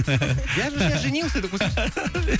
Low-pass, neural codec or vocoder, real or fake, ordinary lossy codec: none; none; real; none